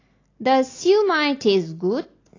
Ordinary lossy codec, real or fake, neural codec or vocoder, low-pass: AAC, 32 kbps; real; none; 7.2 kHz